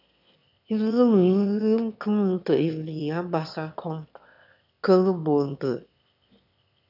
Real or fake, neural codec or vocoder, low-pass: fake; autoencoder, 22.05 kHz, a latent of 192 numbers a frame, VITS, trained on one speaker; 5.4 kHz